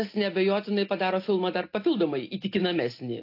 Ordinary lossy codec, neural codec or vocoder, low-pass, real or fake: AAC, 32 kbps; none; 5.4 kHz; real